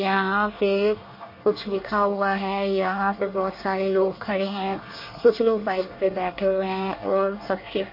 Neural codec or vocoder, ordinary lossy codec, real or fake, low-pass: codec, 24 kHz, 1 kbps, SNAC; MP3, 32 kbps; fake; 5.4 kHz